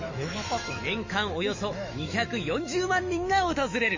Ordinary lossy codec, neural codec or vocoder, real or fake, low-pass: MP3, 32 kbps; autoencoder, 48 kHz, 128 numbers a frame, DAC-VAE, trained on Japanese speech; fake; 7.2 kHz